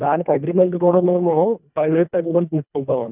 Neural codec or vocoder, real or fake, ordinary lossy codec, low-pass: codec, 24 kHz, 1.5 kbps, HILCodec; fake; none; 3.6 kHz